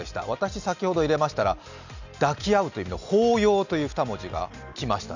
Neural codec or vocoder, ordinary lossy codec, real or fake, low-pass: none; none; real; 7.2 kHz